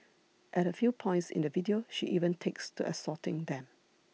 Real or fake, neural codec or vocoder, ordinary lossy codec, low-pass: real; none; none; none